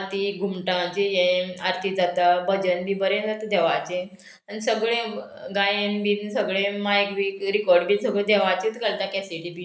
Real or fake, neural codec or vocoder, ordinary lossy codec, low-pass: real; none; none; none